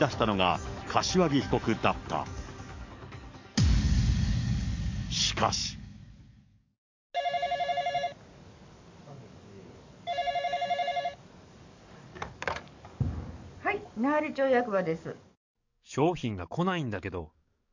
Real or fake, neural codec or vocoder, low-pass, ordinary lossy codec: fake; codec, 44.1 kHz, 7.8 kbps, DAC; 7.2 kHz; MP3, 64 kbps